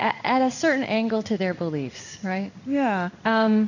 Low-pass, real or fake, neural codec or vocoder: 7.2 kHz; fake; codec, 16 kHz in and 24 kHz out, 1 kbps, XY-Tokenizer